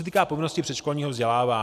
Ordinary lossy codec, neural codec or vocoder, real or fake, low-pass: MP3, 96 kbps; none; real; 14.4 kHz